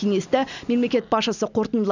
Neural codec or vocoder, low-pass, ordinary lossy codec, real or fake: none; 7.2 kHz; none; real